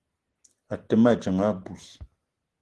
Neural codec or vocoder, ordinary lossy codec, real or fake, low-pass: none; Opus, 16 kbps; real; 10.8 kHz